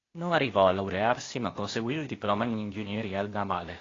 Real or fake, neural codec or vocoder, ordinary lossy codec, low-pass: fake; codec, 16 kHz, 0.8 kbps, ZipCodec; AAC, 32 kbps; 7.2 kHz